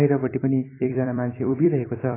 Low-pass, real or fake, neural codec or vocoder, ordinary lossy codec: 3.6 kHz; fake; vocoder, 44.1 kHz, 128 mel bands every 256 samples, BigVGAN v2; AAC, 16 kbps